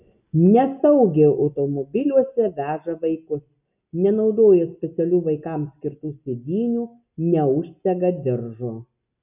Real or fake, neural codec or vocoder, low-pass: real; none; 3.6 kHz